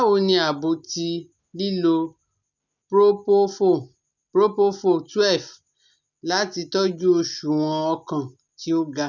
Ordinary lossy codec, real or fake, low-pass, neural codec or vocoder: none; real; 7.2 kHz; none